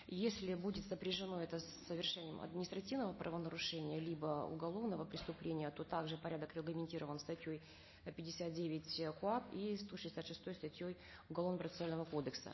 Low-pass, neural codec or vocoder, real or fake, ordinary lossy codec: 7.2 kHz; none; real; MP3, 24 kbps